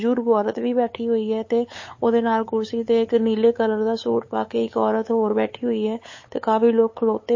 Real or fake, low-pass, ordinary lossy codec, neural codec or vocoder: fake; 7.2 kHz; MP3, 32 kbps; codec, 16 kHz, 8 kbps, FunCodec, trained on LibriTTS, 25 frames a second